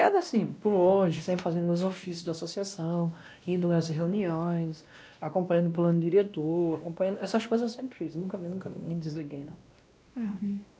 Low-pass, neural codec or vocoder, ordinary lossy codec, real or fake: none; codec, 16 kHz, 1 kbps, X-Codec, WavLM features, trained on Multilingual LibriSpeech; none; fake